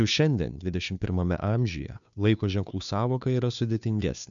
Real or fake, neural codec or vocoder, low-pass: fake; codec, 16 kHz, 2 kbps, FunCodec, trained on Chinese and English, 25 frames a second; 7.2 kHz